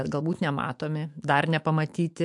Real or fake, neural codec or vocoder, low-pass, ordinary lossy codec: fake; autoencoder, 48 kHz, 128 numbers a frame, DAC-VAE, trained on Japanese speech; 10.8 kHz; MP3, 64 kbps